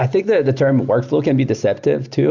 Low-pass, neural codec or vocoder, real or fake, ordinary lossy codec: 7.2 kHz; none; real; Opus, 64 kbps